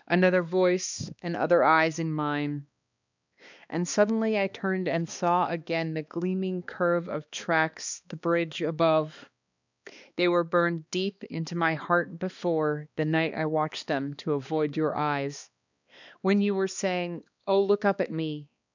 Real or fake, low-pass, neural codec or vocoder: fake; 7.2 kHz; codec, 16 kHz, 2 kbps, X-Codec, HuBERT features, trained on balanced general audio